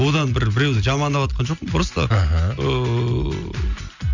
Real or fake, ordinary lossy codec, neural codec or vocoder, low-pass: real; none; none; 7.2 kHz